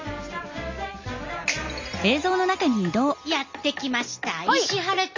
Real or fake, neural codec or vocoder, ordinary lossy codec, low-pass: real; none; MP3, 48 kbps; 7.2 kHz